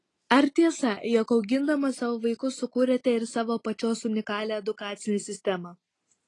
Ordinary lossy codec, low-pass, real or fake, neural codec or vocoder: AAC, 32 kbps; 10.8 kHz; real; none